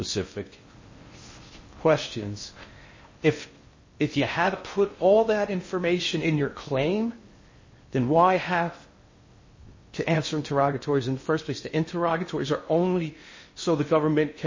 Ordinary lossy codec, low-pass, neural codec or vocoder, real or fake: MP3, 32 kbps; 7.2 kHz; codec, 16 kHz in and 24 kHz out, 0.6 kbps, FocalCodec, streaming, 4096 codes; fake